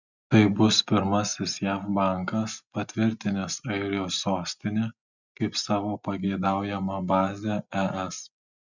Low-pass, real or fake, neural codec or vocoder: 7.2 kHz; real; none